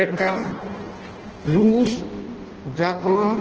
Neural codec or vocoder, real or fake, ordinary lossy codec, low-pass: codec, 16 kHz in and 24 kHz out, 0.6 kbps, FireRedTTS-2 codec; fake; Opus, 16 kbps; 7.2 kHz